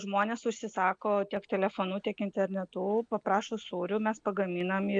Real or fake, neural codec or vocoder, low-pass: real; none; 9.9 kHz